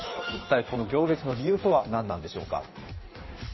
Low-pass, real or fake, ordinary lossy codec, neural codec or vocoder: 7.2 kHz; fake; MP3, 24 kbps; codec, 16 kHz in and 24 kHz out, 1.1 kbps, FireRedTTS-2 codec